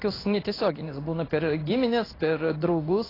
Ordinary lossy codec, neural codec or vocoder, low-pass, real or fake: AAC, 24 kbps; codec, 16 kHz in and 24 kHz out, 1 kbps, XY-Tokenizer; 5.4 kHz; fake